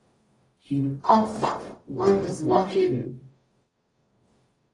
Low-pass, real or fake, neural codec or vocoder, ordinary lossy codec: 10.8 kHz; fake; codec, 44.1 kHz, 0.9 kbps, DAC; AAC, 32 kbps